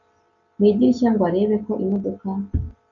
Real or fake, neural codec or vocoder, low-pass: real; none; 7.2 kHz